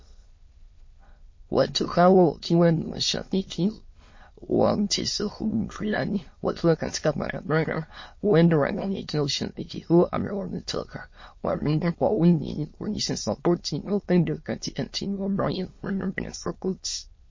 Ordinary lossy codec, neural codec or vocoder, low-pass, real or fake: MP3, 32 kbps; autoencoder, 22.05 kHz, a latent of 192 numbers a frame, VITS, trained on many speakers; 7.2 kHz; fake